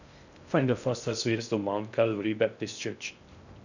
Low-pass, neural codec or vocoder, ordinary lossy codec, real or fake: 7.2 kHz; codec, 16 kHz in and 24 kHz out, 0.6 kbps, FocalCodec, streaming, 2048 codes; none; fake